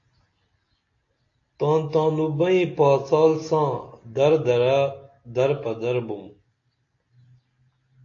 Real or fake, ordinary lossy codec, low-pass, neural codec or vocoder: real; AAC, 48 kbps; 7.2 kHz; none